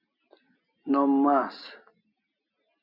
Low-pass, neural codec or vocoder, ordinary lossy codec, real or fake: 5.4 kHz; none; MP3, 48 kbps; real